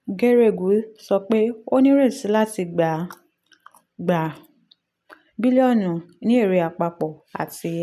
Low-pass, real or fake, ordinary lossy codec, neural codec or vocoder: 14.4 kHz; real; none; none